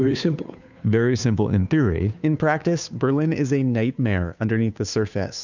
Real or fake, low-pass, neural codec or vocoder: fake; 7.2 kHz; codec, 16 kHz, 2 kbps, FunCodec, trained on Chinese and English, 25 frames a second